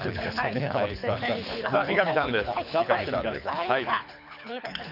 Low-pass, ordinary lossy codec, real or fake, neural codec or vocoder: 5.4 kHz; none; fake; codec, 24 kHz, 6 kbps, HILCodec